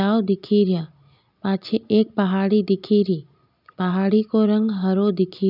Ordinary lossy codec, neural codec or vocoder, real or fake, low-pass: none; none; real; 5.4 kHz